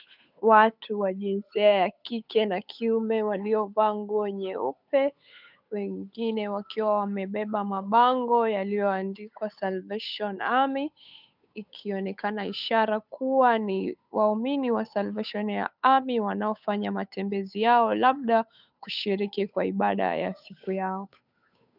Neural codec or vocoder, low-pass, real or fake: codec, 16 kHz, 8 kbps, FunCodec, trained on Chinese and English, 25 frames a second; 5.4 kHz; fake